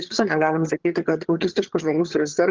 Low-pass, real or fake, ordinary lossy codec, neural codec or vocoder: 7.2 kHz; fake; Opus, 16 kbps; vocoder, 22.05 kHz, 80 mel bands, HiFi-GAN